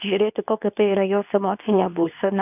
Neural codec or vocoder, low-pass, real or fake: codec, 24 kHz, 0.9 kbps, WavTokenizer, medium speech release version 2; 3.6 kHz; fake